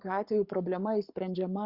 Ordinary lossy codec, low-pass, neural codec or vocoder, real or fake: Opus, 64 kbps; 5.4 kHz; codec, 44.1 kHz, 7.8 kbps, Pupu-Codec; fake